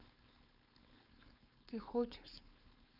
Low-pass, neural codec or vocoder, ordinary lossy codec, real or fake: 5.4 kHz; codec, 16 kHz, 4.8 kbps, FACodec; none; fake